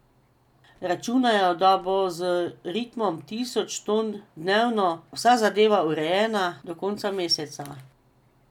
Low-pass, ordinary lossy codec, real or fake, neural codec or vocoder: 19.8 kHz; none; real; none